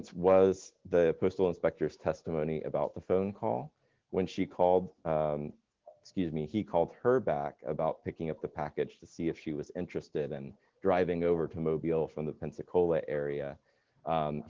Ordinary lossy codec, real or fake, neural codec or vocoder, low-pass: Opus, 16 kbps; real; none; 7.2 kHz